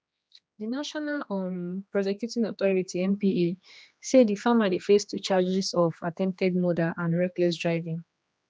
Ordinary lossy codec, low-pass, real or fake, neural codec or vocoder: none; none; fake; codec, 16 kHz, 2 kbps, X-Codec, HuBERT features, trained on general audio